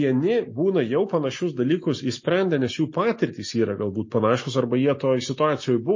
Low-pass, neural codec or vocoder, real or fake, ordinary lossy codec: 7.2 kHz; none; real; MP3, 32 kbps